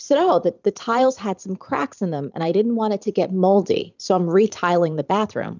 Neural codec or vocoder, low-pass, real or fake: vocoder, 44.1 kHz, 128 mel bands every 512 samples, BigVGAN v2; 7.2 kHz; fake